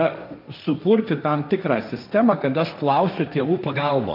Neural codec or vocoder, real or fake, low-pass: codec, 16 kHz, 1.1 kbps, Voila-Tokenizer; fake; 5.4 kHz